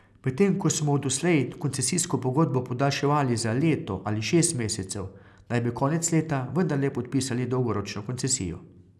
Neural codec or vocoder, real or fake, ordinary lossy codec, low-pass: none; real; none; none